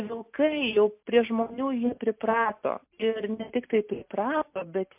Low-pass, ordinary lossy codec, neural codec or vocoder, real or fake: 3.6 kHz; MP3, 32 kbps; none; real